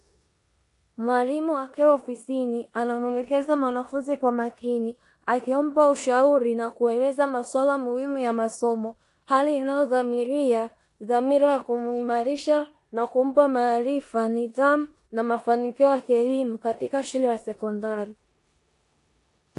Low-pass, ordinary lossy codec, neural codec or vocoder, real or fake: 10.8 kHz; AAC, 48 kbps; codec, 16 kHz in and 24 kHz out, 0.9 kbps, LongCat-Audio-Codec, four codebook decoder; fake